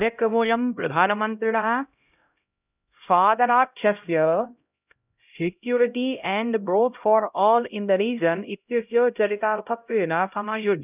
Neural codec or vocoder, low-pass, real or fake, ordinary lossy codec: codec, 16 kHz, 0.5 kbps, X-Codec, HuBERT features, trained on LibriSpeech; 3.6 kHz; fake; none